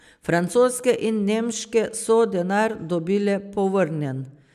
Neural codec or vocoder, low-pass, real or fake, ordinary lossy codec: none; 14.4 kHz; real; none